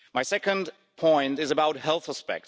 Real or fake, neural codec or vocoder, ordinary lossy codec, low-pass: real; none; none; none